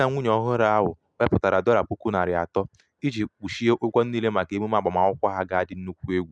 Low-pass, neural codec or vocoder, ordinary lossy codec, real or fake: none; none; none; real